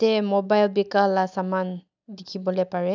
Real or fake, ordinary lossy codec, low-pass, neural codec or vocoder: real; none; 7.2 kHz; none